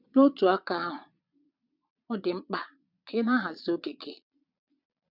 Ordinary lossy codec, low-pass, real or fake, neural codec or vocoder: none; 5.4 kHz; fake; vocoder, 22.05 kHz, 80 mel bands, Vocos